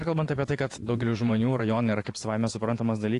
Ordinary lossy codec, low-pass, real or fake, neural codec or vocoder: AAC, 48 kbps; 10.8 kHz; fake; vocoder, 24 kHz, 100 mel bands, Vocos